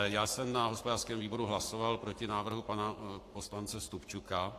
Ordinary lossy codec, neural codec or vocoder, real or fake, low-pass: AAC, 64 kbps; codec, 44.1 kHz, 7.8 kbps, Pupu-Codec; fake; 14.4 kHz